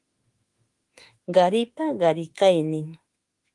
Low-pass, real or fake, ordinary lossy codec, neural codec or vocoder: 10.8 kHz; fake; Opus, 24 kbps; autoencoder, 48 kHz, 32 numbers a frame, DAC-VAE, trained on Japanese speech